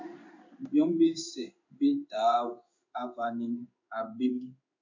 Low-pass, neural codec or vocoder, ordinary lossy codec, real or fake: 7.2 kHz; codec, 16 kHz in and 24 kHz out, 1 kbps, XY-Tokenizer; MP3, 48 kbps; fake